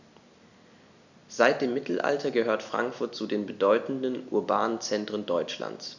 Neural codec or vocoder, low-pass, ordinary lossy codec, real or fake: none; 7.2 kHz; none; real